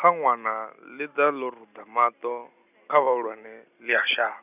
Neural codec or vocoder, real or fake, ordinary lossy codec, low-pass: none; real; none; 3.6 kHz